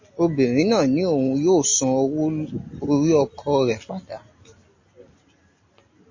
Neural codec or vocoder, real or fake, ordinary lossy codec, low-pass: none; real; MP3, 32 kbps; 7.2 kHz